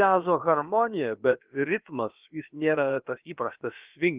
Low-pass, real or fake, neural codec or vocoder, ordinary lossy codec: 3.6 kHz; fake; codec, 16 kHz, about 1 kbps, DyCAST, with the encoder's durations; Opus, 24 kbps